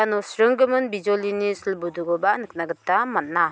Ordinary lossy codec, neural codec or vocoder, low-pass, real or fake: none; none; none; real